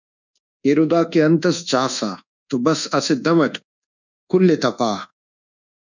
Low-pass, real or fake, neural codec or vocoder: 7.2 kHz; fake; codec, 24 kHz, 1.2 kbps, DualCodec